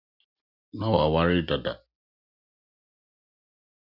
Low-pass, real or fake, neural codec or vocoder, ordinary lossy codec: 5.4 kHz; real; none; Opus, 64 kbps